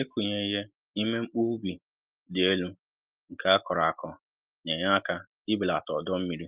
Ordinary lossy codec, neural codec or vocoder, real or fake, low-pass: Opus, 32 kbps; none; real; 3.6 kHz